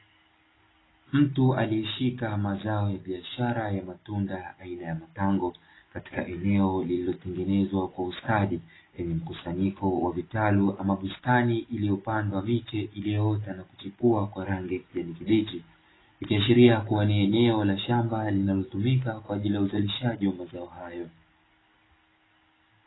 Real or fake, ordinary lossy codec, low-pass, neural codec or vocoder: real; AAC, 16 kbps; 7.2 kHz; none